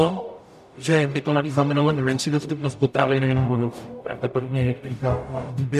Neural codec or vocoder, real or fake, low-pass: codec, 44.1 kHz, 0.9 kbps, DAC; fake; 14.4 kHz